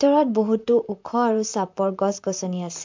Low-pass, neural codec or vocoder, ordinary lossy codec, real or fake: 7.2 kHz; vocoder, 44.1 kHz, 128 mel bands, Pupu-Vocoder; MP3, 64 kbps; fake